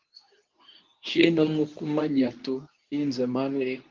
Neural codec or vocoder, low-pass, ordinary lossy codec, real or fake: codec, 24 kHz, 0.9 kbps, WavTokenizer, medium speech release version 2; 7.2 kHz; Opus, 24 kbps; fake